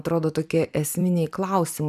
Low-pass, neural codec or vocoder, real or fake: 14.4 kHz; vocoder, 44.1 kHz, 128 mel bands every 256 samples, BigVGAN v2; fake